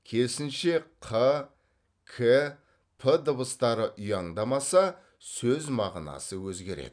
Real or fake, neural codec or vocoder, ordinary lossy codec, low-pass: real; none; none; 9.9 kHz